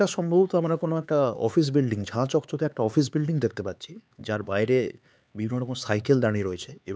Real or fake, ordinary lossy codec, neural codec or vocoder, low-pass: fake; none; codec, 16 kHz, 4 kbps, X-Codec, HuBERT features, trained on LibriSpeech; none